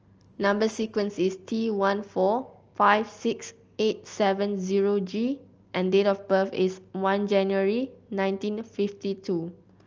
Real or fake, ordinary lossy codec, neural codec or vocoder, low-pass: real; Opus, 24 kbps; none; 7.2 kHz